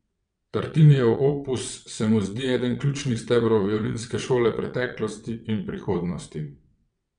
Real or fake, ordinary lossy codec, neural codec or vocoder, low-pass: fake; MP3, 96 kbps; vocoder, 22.05 kHz, 80 mel bands, WaveNeXt; 9.9 kHz